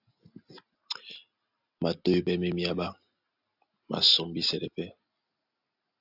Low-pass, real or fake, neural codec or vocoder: 5.4 kHz; real; none